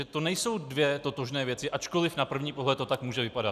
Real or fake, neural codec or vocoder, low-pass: real; none; 14.4 kHz